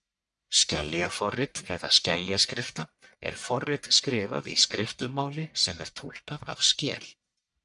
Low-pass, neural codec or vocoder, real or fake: 10.8 kHz; codec, 44.1 kHz, 1.7 kbps, Pupu-Codec; fake